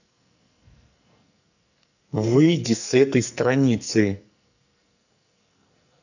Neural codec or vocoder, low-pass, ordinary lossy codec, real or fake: codec, 44.1 kHz, 2.6 kbps, SNAC; 7.2 kHz; none; fake